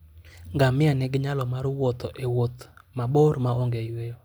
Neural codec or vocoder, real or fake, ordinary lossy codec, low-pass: none; real; none; none